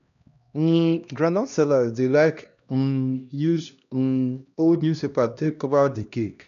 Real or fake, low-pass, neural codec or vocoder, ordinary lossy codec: fake; 7.2 kHz; codec, 16 kHz, 1 kbps, X-Codec, HuBERT features, trained on LibriSpeech; none